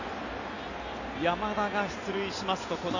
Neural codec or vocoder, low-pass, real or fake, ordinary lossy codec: none; 7.2 kHz; real; none